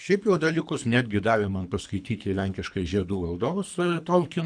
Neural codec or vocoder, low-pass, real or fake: codec, 24 kHz, 3 kbps, HILCodec; 9.9 kHz; fake